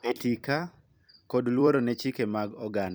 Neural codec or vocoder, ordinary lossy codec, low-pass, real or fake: vocoder, 44.1 kHz, 128 mel bands every 256 samples, BigVGAN v2; none; none; fake